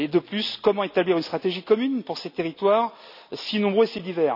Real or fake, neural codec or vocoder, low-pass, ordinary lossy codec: real; none; 5.4 kHz; none